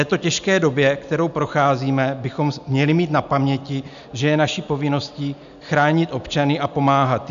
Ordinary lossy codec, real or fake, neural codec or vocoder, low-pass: MP3, 96 kbps; real; none; 7.2 kHz